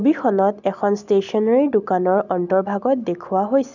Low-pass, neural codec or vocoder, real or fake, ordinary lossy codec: 7.2 kHz; none; real; none